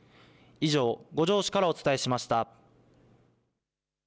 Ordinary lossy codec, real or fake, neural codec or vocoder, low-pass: none; real; none; none